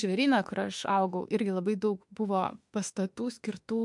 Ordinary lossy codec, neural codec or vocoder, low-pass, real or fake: MP3, 64 kbps; autoencoder, 48 kHz, 32 numbers a frame, DAC-VAE, trained on Japanese speech; 10.8 kHz; fake